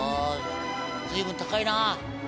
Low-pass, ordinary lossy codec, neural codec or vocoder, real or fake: none; none; none; real